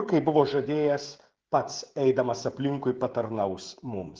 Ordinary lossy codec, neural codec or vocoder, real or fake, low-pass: Opus, 16 kbps; codec, 16 kHz, 16 kbps, FreqCodec, smaller model; fake; 7.2 kHz